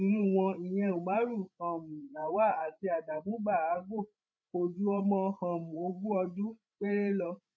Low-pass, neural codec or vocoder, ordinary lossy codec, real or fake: none; codec, 16 kHz, 16 kbps, FreqCodec, larger model; none; fake